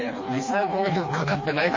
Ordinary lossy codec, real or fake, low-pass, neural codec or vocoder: MP3, 32 kbps; fake; 7.2 kHz; codec, 16 kHz, 2 kbps, FreqCodec, smaller model